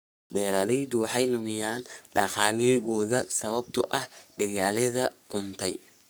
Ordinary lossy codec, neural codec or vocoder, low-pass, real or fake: none; codec, 44.1 kHz, 3.4 kbps, Pupu-Codec; none; fake